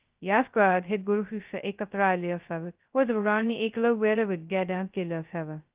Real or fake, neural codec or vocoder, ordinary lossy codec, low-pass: fake; codec, 16 kHz, 0.2 kbps, FocalCodec; Opus, 32 kbps; 3.6 kHz